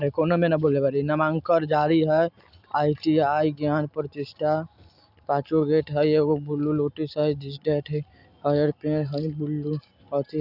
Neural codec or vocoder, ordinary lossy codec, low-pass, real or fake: none; none; 5.4 kHz; real